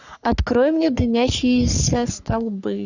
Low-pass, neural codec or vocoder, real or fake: 7.2 kHz; codec, 44.1 kHz, 3.4 kbps, Pupu-Codec; fake